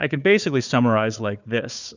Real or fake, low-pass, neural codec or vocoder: fake; 7.2 kHz; codec, 16 kHz, 4 kbps, FunCodec, trained on Chinese and English, 50 frames a second